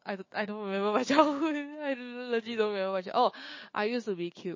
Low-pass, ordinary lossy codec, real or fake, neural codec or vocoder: 7.2 kHz; MP3, 32 kbps; fake; codec, 16 kHz, 6 kbps, DAC